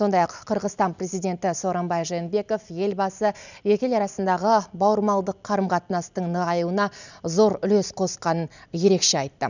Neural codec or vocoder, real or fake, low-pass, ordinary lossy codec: none; real; 7.2 kHz; none